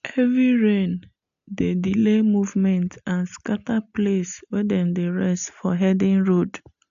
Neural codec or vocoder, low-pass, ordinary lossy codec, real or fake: none; 7.2 kHz; AAC, 64 kbps; real